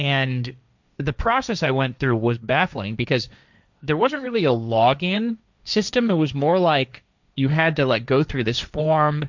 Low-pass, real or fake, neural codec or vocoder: 7.2 kHz; fake; codec, 16 kHz, 1.1 kbps, Voila-Tokenizer